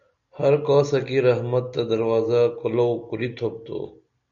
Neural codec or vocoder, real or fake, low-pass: none; real; 7.2 kHz